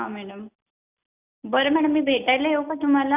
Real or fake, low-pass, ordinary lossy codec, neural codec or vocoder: real; 3.6 kHz; none; none